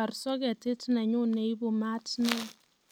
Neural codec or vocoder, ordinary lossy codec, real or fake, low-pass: none; none; real; none